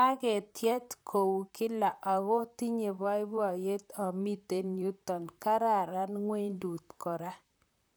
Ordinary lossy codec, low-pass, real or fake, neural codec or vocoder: none; none; fake; vocoder, 44.1 kHz, 128 mel bands, Pupu-Vocoder